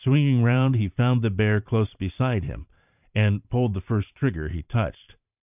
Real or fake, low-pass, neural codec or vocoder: fake; 3.6 kHz; vocoder, 44.1 kHz, 128 mel bands every 512 samples, BigVGAN v2